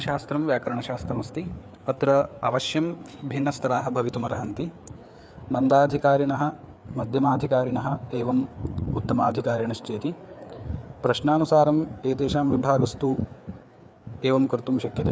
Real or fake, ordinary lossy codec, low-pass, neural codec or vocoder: fake; none; none; codec, 16 kHz, 4 kbps, FreqCodec, larger model